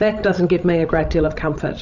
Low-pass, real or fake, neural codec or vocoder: 7.2 kHz; fake; codec, 16 kHz, 16 kbps, FreqCodec, larger model